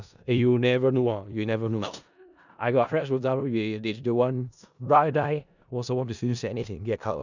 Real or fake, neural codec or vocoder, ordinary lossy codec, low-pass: fake; codec, 16 kHz in and 24 kHz out, 0.4 kbps, LongCat-Audio-Codec, four codebook decoder; none; 7.2 kHz